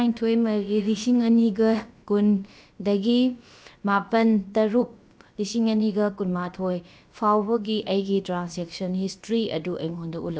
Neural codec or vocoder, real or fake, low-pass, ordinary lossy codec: codec, 16 kHz, about 1 kbps, DyCAST, with the encoder's durations; fake; none; none